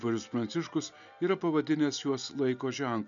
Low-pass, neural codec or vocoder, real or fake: 7.2 kHz; none; real